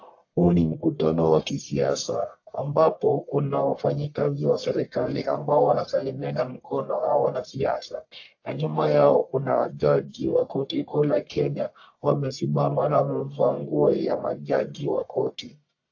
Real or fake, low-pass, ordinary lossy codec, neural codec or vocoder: fake; 7.2 kHz; AAC, 48 kbps; codec, 44.1 kHz, 1.7 kbps, Pupu-Codec